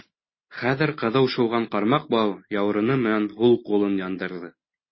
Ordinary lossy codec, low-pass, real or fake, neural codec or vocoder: MP3, 24 kbps; 7.2 kHz; real; none